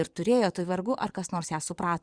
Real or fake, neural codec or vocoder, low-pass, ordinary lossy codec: fake; vocoder, 22.05 kHz, 80 mel bands, Vocos; 9.9 kHz; Opus, 64 kbps